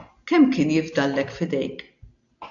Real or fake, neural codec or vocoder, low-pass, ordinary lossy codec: real; none; 7.2 kHz; AAC, 64 kbps